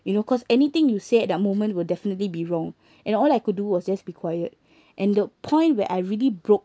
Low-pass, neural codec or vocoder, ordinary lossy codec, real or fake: none; none; none; real